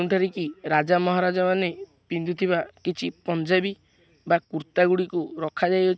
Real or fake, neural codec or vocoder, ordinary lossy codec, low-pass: real; none; none; none